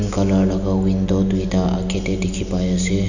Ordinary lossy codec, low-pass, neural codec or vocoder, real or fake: none; 7.2 kHz; none; real